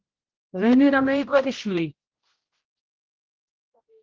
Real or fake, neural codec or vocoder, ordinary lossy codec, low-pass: fake; codec, 16 kHz, 0.5 kbps, X-Codec, HuBERT features, trained on general audio; Opus, 16 kbps; 7.2 kHz